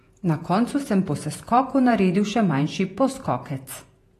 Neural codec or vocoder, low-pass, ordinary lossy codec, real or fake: vocoder, 48 kHz, 128 mel bands, Vocos; 14.4 kHz; AAC, 48 kbps; fake